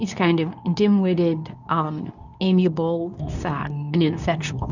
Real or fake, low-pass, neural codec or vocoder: fake; 7.2 kHz; codec, 24 kHz, 0.9 kbps, WavTokenizer, medium speech release version 2